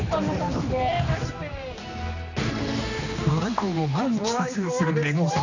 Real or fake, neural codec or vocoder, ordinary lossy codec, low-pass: fake; codec, 16 kHz, 2 kbps, X-Codec, HuBERT features, trained on general audio; none; 7.2 kHz